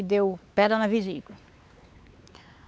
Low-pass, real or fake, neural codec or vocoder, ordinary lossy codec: none; fake; codec, 16 kHz, 4 kbps, X-Codec, WavLM features, trained on Multilingual LibriSpeech; none